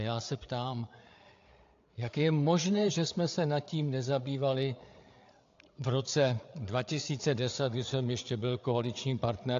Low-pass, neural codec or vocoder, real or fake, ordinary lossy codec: 7.2 kHz; codec, 16 kHz, 8 kbps, FreqCodec, larger model; fake; AAC, 48 kbps